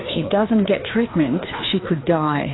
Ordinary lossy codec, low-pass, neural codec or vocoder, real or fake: AAC, 16 kbps; 7.2 kHz; codec, 16 kHz, 2 kbps, FreqCodec, larger model; fake